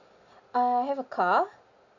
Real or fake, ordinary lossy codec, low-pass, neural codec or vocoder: real; none; 7.2 kHz; none